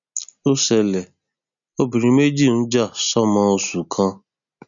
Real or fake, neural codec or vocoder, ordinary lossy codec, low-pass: real; none; none; 7.2 kHz